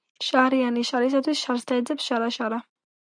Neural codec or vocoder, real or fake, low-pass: none; real; 9.9 kHz